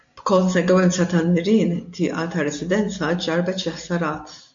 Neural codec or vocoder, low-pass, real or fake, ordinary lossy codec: none; 7.2 kHz; real; MP3, 48 kbps